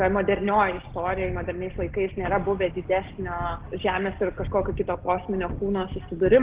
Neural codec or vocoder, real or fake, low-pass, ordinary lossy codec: none; real; 3.6 kHz; Opus, 16 kbps